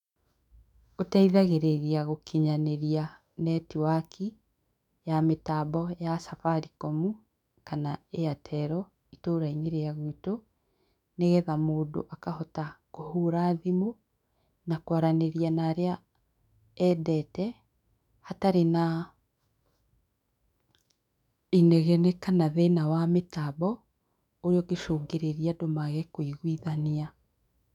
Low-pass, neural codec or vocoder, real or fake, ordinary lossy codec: 19.8 kHz; autoencoder, 48 kHz, 128 numbers a frame, DAC-VAE, trained on Japanese speech; fake; none